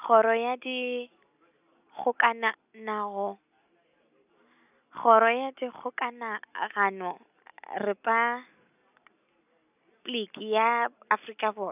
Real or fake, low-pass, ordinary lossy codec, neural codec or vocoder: real; 3.6 kHz; none; none